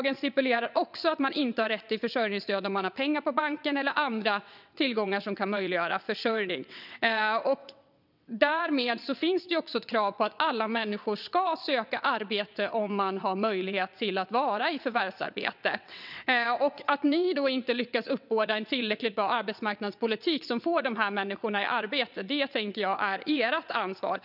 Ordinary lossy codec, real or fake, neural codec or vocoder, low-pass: none; fake; vocoder, 22.05 kHz, 80 mel bands, WaveNeXt; 5.4 kHz